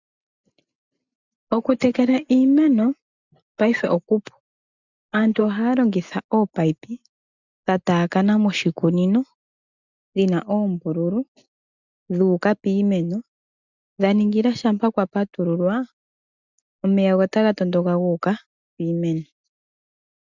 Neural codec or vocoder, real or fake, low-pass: none; real; 7.2 kHz